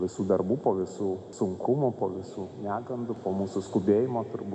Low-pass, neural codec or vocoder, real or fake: 10.8 kHz; none; real